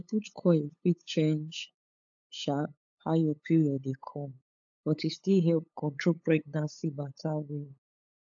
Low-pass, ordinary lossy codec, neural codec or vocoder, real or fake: 7.2 kHz; AAC, 64 kbps; codec, 16 kHz, 8 kbps, FunCodec, trained on LibriTTS, 25 frames a second; fake